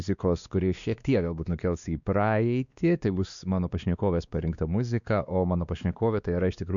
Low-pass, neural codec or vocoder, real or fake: 7.2 kHz; codec, 16 kHz, 2 kbps, X-Codec, WavLM features, trained on Multilingual LibriSpeech; fake